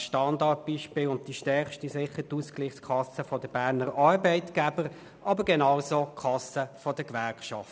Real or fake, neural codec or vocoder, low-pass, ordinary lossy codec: real; none; none; none